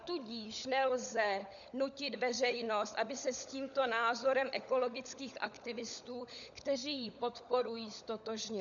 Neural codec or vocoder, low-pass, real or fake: codec, 16 kHz, 16 kbps, FunCodec, trained on LibriTTS, 50 frames a second; 7.2 kHz; fake